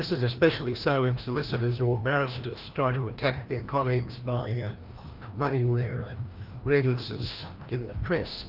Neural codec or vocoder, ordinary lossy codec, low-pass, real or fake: codec, 16 kHz, 1 kbps, FreqCodec, larger model; Opus, 24 kbps; 5.4 kHz; fake